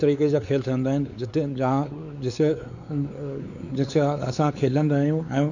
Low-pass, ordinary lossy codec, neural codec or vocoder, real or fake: 7.2 kHz; none; codec, 16 kHz, 4 kbps, X-Codec, WavLM features, trained on Multilingual LibriSpeech; fake